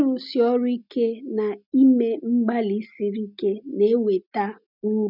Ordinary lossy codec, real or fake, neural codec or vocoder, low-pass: none; real; none; 5.4 kHz